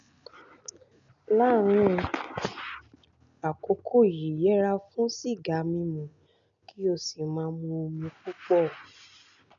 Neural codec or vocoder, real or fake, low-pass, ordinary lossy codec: none; real; 7.2 kHz; none